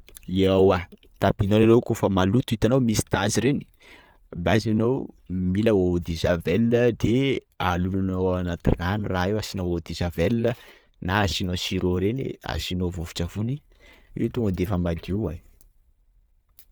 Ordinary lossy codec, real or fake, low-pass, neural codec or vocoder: none; real; none; none